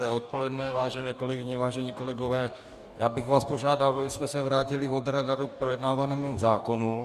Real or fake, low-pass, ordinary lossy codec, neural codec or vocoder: fake; 14.4 kHz; Opus, 64 kbps; codec, 44.1 kHz, 2.6 kbps, DAC